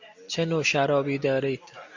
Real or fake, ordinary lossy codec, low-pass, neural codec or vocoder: real; MP3, 48 kbps; 7.2 kHz; none